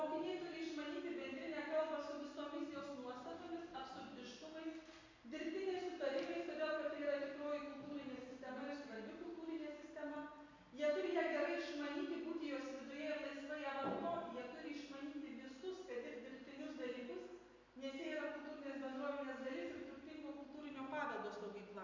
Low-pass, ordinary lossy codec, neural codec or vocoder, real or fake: 7.2 kHz; MP3, 48 kbps; none; real